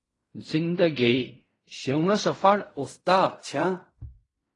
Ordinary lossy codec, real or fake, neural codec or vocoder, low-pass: AAC, 32 kbps; fake; codec, 16 kHz in and 24 kHz out, 0.4 kbps, LongCat-Audio-Codec, fine tuned four codebook decoder; 10.8 kHz